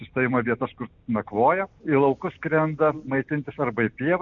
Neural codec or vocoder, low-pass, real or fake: none; 7.2 kHz; real